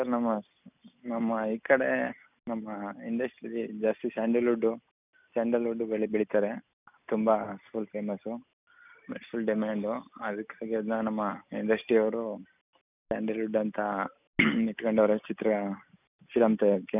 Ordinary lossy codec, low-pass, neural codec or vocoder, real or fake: none; 3.6 kHz; none; real